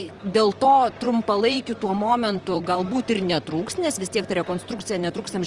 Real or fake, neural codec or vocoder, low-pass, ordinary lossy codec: fake; vocoder, 44.1 kHz, 128 mel bands, Pupu-Vocoder; 10.8 kHz; Opus, 24 kbps